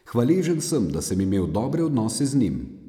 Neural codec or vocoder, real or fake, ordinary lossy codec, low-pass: none; real; none; 19.8 kHz